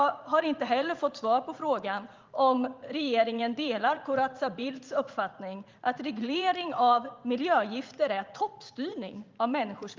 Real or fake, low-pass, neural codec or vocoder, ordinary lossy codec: fake; 7.2 kHz; vocoder, 44.1 kHz, 80 mel bands, Vocos; Opus, 24 kbps